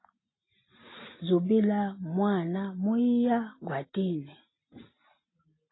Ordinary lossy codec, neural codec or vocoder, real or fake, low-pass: AAC, 16 kbps; none; real; 7.2 kHz